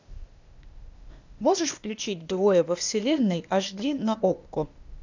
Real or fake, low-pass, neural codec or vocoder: fake; 7.2 kHz; codec, 16 kHz, 0.8 kbps, ZipCodec